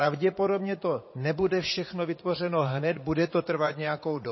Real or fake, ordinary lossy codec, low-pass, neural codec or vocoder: fake; MP3, 24 kbps; 7.2 kHz; vocoder, 44.1 kHz, 80 mel bands, Vocos